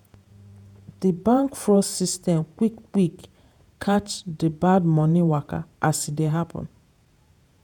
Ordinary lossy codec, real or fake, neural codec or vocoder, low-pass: none; fake; vocoder, 48 kHz, 128 mel bands, Vocos; 19.8 kHz